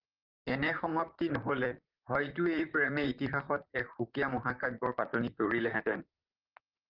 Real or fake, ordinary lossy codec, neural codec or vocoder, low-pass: fake; Opus, 32 kbps; vocoder, 44.1 kHz, 128 mel bands, Pupu-Vocoder; 5.4 kHz